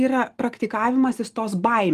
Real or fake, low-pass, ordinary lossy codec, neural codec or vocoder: real; 14.4 kHz; Opus, 24 kbps; none